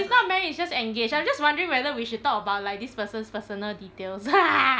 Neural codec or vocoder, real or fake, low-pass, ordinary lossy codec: none; real; none; none